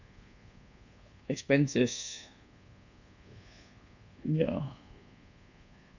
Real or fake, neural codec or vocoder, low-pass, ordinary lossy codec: fake; codec, 24 kHz, 1.2 kbps, DualCodec; 7.2 kHz; none